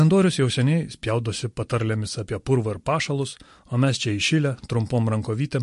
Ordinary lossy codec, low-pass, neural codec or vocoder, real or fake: MP3, 48 kbps; 14.4 kHz; vocoder, 44.1 kHz, 128 mel bands every 256 samples, BigVGAN v2; fake